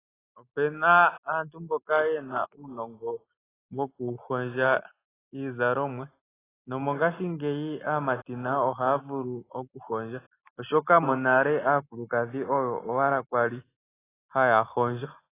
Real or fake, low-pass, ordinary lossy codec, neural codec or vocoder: real; 3.6 kHz; AAC, 16 kbps; none